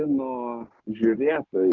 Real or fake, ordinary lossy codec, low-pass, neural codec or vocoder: real; MP3, 64 kbps; 7.2 kHz; none